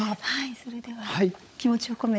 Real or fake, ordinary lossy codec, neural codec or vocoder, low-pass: fake; none; codec, 16 kHz, 16 kbps, FunCodec, trained on LibriTTS, 50 frames a second; none